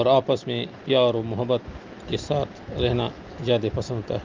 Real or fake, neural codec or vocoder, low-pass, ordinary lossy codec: real; none; 7.2 kHz; Opus, 24 kbps